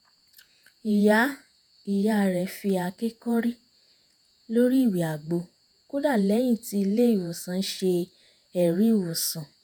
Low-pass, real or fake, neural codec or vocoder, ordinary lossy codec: none; fake; vocoder, 48 kHz, 128 mel bands, Vocos; none